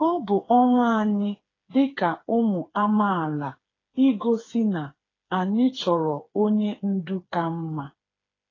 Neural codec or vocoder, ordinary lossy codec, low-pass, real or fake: codec, 16 kHz, 4 kbps, FreqCodec, smaller model; AAC, 32 kbps; 7.2 kHz; fake